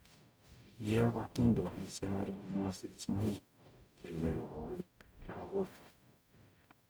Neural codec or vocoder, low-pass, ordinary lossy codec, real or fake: codec, 44.1 kHz, 0.9 kbps, DAC; none; none; fake